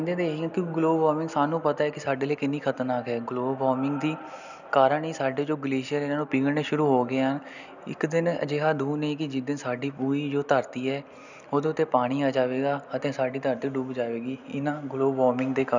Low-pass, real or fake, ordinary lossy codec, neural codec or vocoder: 7.2 kHz; real; none; none